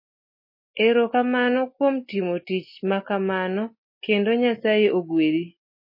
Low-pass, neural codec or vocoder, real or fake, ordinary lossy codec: 5.4 kHz; none; real; MP3, 24 kbps